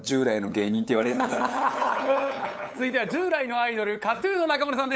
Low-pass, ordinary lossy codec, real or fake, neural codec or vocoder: none; none; fake; codec, 16 kHz, 8 kbps, FunCodec, trained on LibriTTS, 25 frames a second